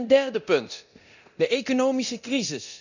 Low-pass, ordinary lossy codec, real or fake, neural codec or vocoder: 7.2 kHz; none; fake; codec, 24 kHz, 0.9 kbps, DualCodec